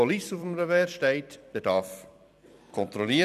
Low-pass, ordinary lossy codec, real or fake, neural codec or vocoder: 14.4 kHz; none; fake; vocoder, 44.1 kHz, 128 mel bands every 256 samples, BigVGAN v2